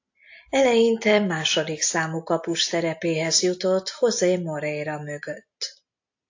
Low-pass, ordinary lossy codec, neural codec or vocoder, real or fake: 7.2 kHz; AAC, 48 kbps; none; real